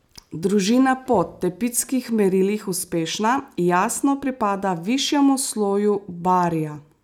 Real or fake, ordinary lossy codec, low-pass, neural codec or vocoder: real; none; 19.8 kHz; none